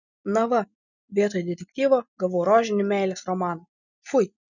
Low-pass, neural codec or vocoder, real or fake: 7.2 kHz; none; real